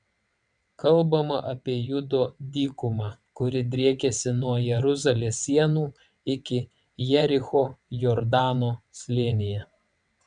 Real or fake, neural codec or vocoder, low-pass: fake; vocoder, 22.05 kHz, 80 mel bands, WaveNeXt; 9.9 kHz